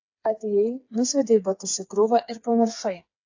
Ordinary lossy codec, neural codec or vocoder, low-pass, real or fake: AAC, 48 kbps; codec, 16 kHz, 4 kbps, FreqCodec, smaller model; 7.2 kHz; fake